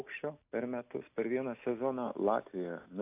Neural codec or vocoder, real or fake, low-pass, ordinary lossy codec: none; real; 3.6 kHz; AAC, 32 kbps